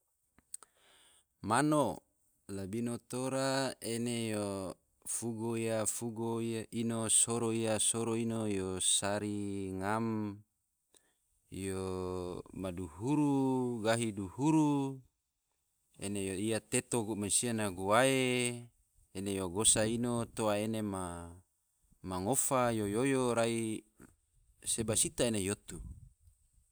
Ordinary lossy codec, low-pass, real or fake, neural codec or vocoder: none; none; real; none